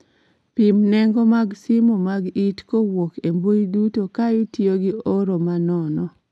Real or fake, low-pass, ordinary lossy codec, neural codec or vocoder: real; none; none; none